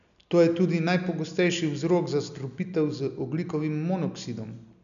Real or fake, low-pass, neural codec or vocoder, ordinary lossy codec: real; 7.2 kHz; none; none